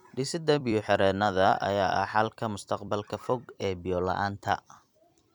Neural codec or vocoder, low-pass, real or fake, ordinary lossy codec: vocoder, 44.1 kHz, 128 mel bands every 256 samples, BigVGAN v2; 19.8 kHz; fake; none